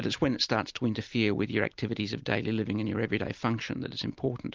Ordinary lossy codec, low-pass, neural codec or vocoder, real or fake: Opus, 32 kbps; 7.2 kHz; none; real